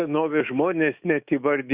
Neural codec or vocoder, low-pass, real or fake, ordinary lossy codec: autoencoder, 48 kHz, 128 numbers a frame, DAC-VAE, trained on Japanese speech; 3.6 kHz; fake; Opus, 64 kbps